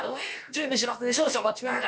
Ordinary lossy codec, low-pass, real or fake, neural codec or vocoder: none; none; fake; codec, 16 kHz, about 1 kbps, DyCAST, with the encoder's durations